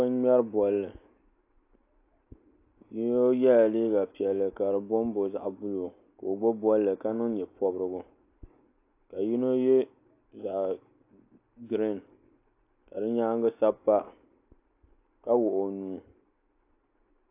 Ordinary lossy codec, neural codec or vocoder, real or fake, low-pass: AAC, 24 kbps; none; real; 3.6 kHz